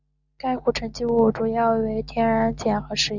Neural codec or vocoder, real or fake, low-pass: none; real; 7.2 kHz